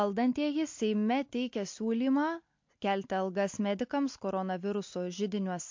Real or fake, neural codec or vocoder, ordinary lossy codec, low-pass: real; none; MP3, 48 kbps; 7.2 kHz